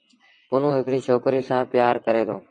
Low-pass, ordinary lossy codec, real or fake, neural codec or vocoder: 9.9 kHz; MP3, 48 kbps; fake; vocoder, 22.05 kHz, 80 mel bands, WaveNeXt